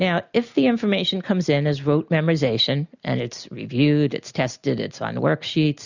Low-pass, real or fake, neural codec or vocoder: 7.2 kHz; real; none